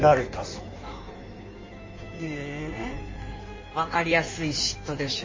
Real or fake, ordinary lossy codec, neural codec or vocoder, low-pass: fake; MP3, 32 kbps; codec, 16 kHz in and 24 kHz out, 1.1 kbps, FireRedTTS-2 codec; 7.2 kHz